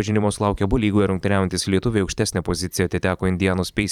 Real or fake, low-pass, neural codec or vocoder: fake; 19.8 kHz; vocoder, 44.1 kHz, 128 mel bands every 512 samples, BigVGAN v2